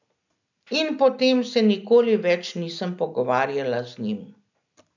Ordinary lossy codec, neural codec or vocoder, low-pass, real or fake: none; none; 7.2 kHz; real